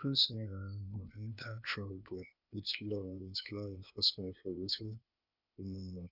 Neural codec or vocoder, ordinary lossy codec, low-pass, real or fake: codec, 16 kHz, 0.8 kbps, ZipCodec; none; 5.4 kHz; fake